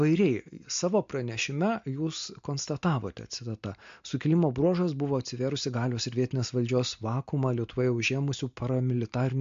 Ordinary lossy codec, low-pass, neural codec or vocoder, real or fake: MP3, 48 kbps; 7.2 kHz; none; real